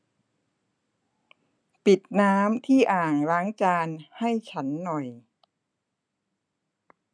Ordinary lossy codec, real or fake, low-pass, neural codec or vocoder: none; real; 9.9 kHz; none